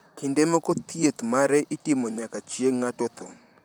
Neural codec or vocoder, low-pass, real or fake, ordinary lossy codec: vocoder, 44.1 kHz, 128 mel bands, Pupu-Vocoder; none; fake; none